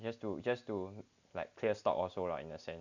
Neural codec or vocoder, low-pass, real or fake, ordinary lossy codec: none; 7.2 kHz; real; none